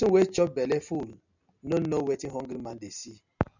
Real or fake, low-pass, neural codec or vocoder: real; 7.2 kHz; none